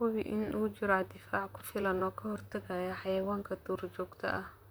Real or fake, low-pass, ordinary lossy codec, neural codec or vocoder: fake; none; none; vocoder, 44.1 kHz, 128 mel bands, Pupu-Vocoder